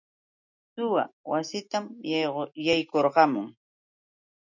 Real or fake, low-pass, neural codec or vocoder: real; 7.2 kHz; none